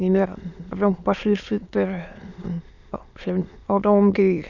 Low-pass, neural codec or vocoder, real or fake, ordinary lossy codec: 7.2 kHz; autoencoder, 22.05 kHz, a latent of 192 numbers a frame, VITS, trained on many speakers; fake; none